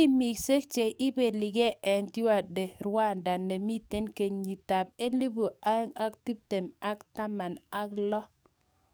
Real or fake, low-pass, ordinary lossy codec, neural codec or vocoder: fake; none; none; codec, 44.1 kHz, 7.8 kbps, DAC